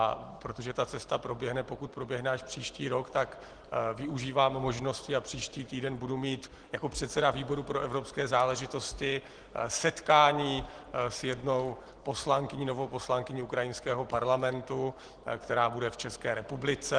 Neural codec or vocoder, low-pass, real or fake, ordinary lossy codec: none; 9.9 kHz; real; Opus, 16 kbps